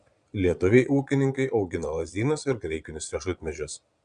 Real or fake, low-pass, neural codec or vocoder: fake; 9.9 kHz; vocoder, 22.05 kHz, 80 mel bands, Vocos